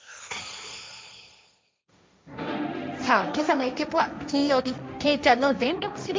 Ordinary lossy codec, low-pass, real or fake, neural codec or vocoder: none; none; fake; codec, 16 kHz, 1.1 kbps, Voila-Tokenizer